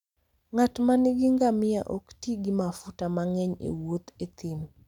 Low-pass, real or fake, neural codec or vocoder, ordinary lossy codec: 19.8 kHz; real; none; none